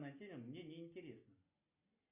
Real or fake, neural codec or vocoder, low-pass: real; none; 3.6 kHz